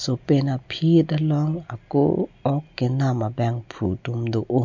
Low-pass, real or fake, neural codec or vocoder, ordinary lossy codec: 7.2 kHz; real; none; MP3, 64 kbps